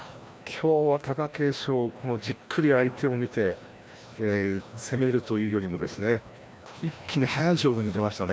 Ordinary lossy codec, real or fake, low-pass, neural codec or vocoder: none; fake; none; codec, 16 kHz, 1 kbps, FreqCodec, larger model